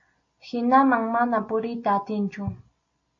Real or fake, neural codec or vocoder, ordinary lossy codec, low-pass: real; none; AAC, 48 kbps; 7.2 kHz